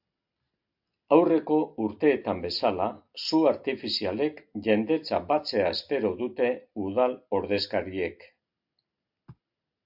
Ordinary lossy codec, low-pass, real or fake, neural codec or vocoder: AAC, 48 kbps; 5.4 kHz; real; none